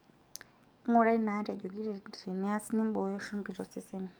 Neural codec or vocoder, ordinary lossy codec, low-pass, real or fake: codec, 44.1 kHz, 7.8 kbps, DAC; none; none; fake